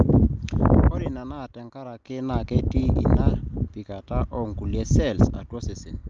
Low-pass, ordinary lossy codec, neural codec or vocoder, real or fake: 7.2 kHz; Opus, 24 kbps; none; real